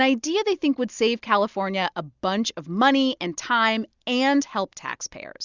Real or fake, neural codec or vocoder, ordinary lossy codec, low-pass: real; none; Opus, 64 kbps; 7.2 kHz